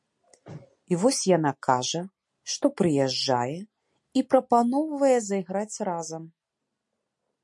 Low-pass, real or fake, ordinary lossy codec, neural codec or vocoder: 10.8 kHz; real; MP3, 48 kbps; none